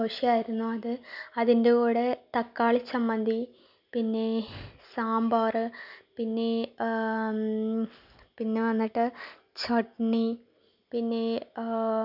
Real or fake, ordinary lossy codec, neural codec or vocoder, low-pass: real; none; none; 5.4 kHz